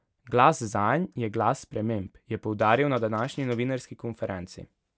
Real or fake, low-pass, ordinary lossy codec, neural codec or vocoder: real; none; none; none